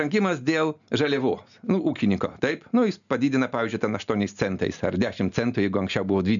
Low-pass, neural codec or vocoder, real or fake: 7.2 kHz; none; real